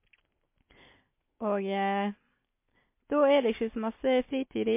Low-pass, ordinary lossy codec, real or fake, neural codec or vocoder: 3.6 kHz; MP3, 24 kbps; real; none